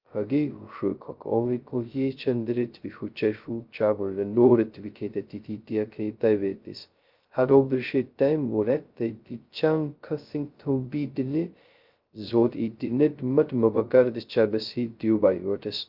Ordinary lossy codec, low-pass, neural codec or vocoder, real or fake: Opus, 24 kbps; 5.4 kHz; codec, 16 kHz, 0.2 kbps, FocalCodec; fake